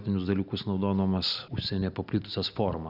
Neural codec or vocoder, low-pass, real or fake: none; 5.4 kHz; real